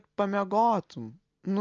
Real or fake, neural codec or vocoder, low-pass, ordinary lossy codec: real; none; 7.2 kHz; Opus, 24 kbps